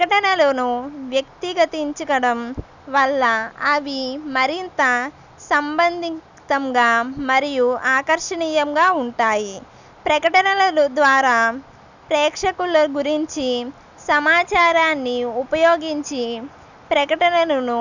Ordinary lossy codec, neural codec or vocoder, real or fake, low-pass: none; none; real; 7.2 kHz